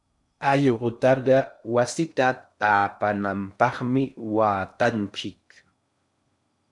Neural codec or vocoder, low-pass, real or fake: codec, 16 kHz in and 24 kHz out, 0.6 kbps, FocalCodec, streaming, 2048 codes; 10.8 kHz; fake